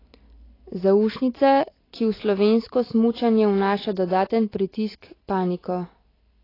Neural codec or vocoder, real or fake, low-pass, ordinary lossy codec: none; real; 5.4 kHz; AAC, 24 kbps